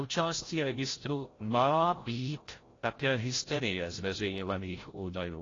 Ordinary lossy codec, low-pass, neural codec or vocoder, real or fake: AAC, 32 kbps; 7.2 kHz; codec, 16 kHz, 0.5 kbps, FreqCodec, larger model; fake